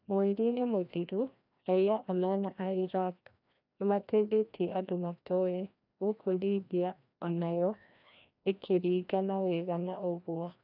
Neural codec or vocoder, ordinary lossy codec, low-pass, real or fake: codec, 16 kHz, 1 kbps, FreqCodec, larger model; none; 5.4 kHz; fake